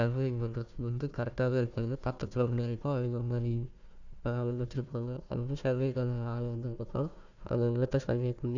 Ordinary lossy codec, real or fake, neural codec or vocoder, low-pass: none; fake; codec, 16 kHz, 1 kbps, FunCodec, trained on Chinese and English, 50 frames a second; 7.2 kHz